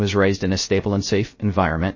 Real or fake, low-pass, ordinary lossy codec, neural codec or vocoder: fake; 7.2 kHz; MP3, 32 kbps; codec, 16 kHz, 0.3 kbps, FocalCodec